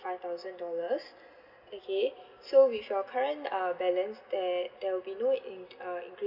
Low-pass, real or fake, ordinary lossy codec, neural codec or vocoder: 5.4 kHz; real; none; none